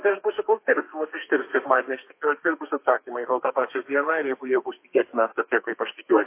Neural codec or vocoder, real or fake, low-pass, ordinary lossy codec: codec, 32 kHz, 1.9 kbps, SNAC; fake; 3.6 kHz; MP3, 24 kbps